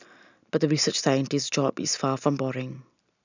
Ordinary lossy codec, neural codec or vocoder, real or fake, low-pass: none; none; real; 7.2 kHz